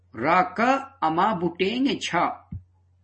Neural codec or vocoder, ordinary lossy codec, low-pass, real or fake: none; MP3, 32 kbps; 10.8 kHz; real